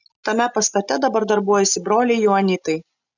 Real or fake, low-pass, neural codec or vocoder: real; 7.2 kHz; none